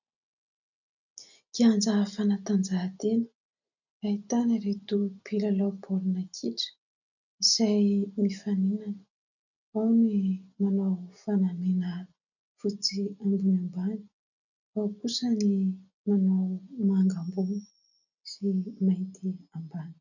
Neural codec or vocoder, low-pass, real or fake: none; 7.2 kHz; real